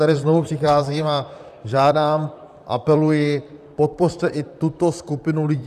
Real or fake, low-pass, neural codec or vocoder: fake; 14.4 kHz; vocoder, 44.1 kHz, 128 mel bands, Pupu-Vocoder